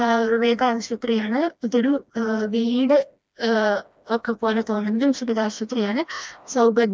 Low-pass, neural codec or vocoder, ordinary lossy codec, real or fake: none; codec, 16 kHz, 1 kbps, FreqCodec, smaller model; none; fake